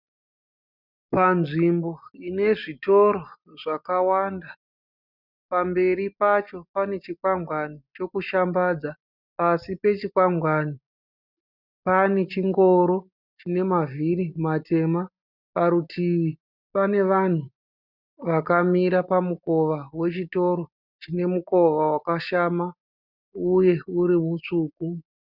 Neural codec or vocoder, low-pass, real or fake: none; 5.4 kHz; real